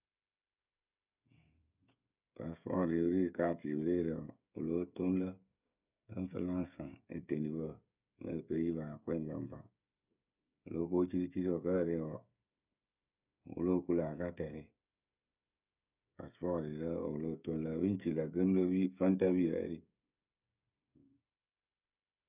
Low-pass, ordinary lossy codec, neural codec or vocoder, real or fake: 3.6 kHz; none; codec, 16 kHz, 16 kbps, FreqCodec, smaller model; fake